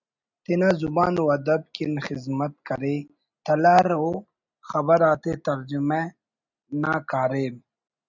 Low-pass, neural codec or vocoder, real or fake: 7.2 kHz; none; real